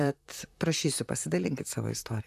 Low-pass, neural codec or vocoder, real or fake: 14.4 kHz; vocoder, 44.1 kHz, 128 mel bands, Pupu-Vocoder; fake